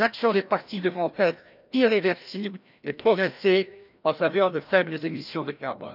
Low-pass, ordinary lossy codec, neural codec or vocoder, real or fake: 5.4 kHz; none; codec, 16 kHz, 1 kbps, FreqCodec, larger model; fake